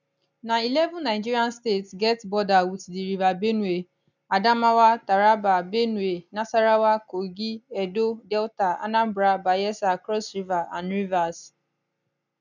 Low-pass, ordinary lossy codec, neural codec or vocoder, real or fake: 7.2 kHz; none; none; real